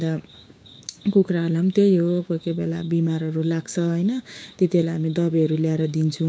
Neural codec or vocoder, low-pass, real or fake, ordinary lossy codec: codec, 16 kHz, 6 kbps, DAC; none; fake; none